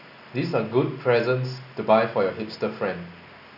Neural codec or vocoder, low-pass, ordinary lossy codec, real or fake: none; 5.4 kHz; none; real